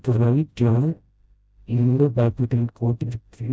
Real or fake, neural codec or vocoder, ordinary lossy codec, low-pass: fake; codec, 16 kHz, 0.5 kbps, FreqCodec, smaller model; none; none